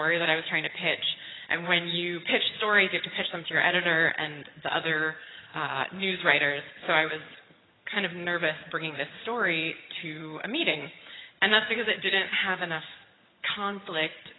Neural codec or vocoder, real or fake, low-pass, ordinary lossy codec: vocoder, 22.05 kHz, 80 mel bands, HiFi-GAN; fake; 7.2 kHz; AAC, 16 kbps